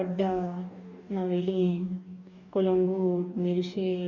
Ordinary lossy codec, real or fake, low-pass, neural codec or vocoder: none; fake; 7.2 kHz; codec, 44.1 kHz, 2.6 kbps, DAC